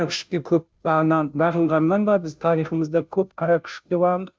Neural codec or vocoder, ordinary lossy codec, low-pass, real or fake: codec, 16 kHz, 0.5 kbps, FunCodec, trained on Chinese and English, 25 frames a second; none; none; fake